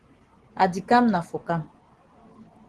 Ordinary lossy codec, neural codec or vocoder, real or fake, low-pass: Opus, 16 kbps; none; real; 10.8 kHz